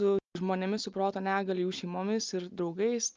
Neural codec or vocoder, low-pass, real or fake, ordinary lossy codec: none; 7.2 kHz; real; Opus, 24 kbps